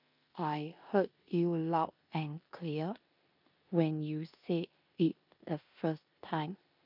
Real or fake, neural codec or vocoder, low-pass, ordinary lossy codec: fake; codec, 16 kHz in and 24 kHz out, 0.9 kbps, LongCat-Audio-Codec, four codebook decoder; 5.4 kHz; MP3, 48 kbps